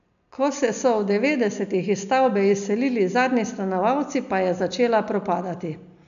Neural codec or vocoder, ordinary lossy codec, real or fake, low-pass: none; none; real; 7.2 kHz